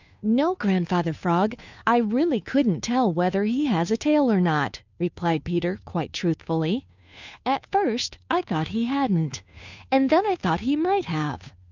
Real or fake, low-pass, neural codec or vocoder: fake; 7.2 kHz; codec, 16 kHz, 2 kbps, FunCodec, trained on Chinese and English, 25 frames a second